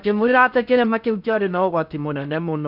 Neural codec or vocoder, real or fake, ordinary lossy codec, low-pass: codec, 16 kHz in and 24 kHz out, 0.6 kbps, FocalCodec, streaming, 2048 codes; fake; none; 5.4 kHz